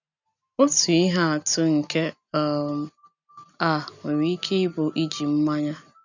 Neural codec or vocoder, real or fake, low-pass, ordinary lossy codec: none; real; 7.2 kHz; none